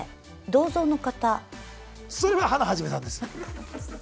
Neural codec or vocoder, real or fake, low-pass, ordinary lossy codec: none; real; none; none